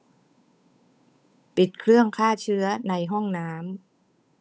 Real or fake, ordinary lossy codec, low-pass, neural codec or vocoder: fake; none; none; codec, 16 kHz, 8 kbps, FunCodec, trained on Chinese and English, 25 frames a second